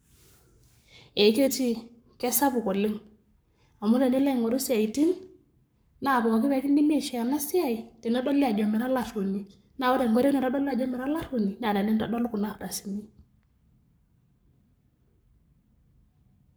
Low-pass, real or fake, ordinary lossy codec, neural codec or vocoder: none; fake; none; codec, 44.1 kHz, 7.8 kbps, Pupu-Codec